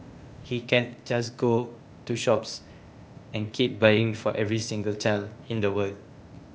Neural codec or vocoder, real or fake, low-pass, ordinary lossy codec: codec, 16 kHz, 0.8 kbps, ZipCodec; fake; none; none